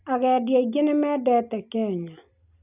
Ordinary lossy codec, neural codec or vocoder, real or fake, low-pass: none; none; real; 3.6 kHz